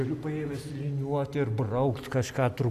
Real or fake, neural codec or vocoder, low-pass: real; none; 14.4 kHz